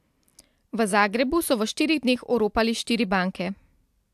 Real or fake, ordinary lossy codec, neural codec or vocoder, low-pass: real; AAC, 96 kbps; none; 14.4 kHz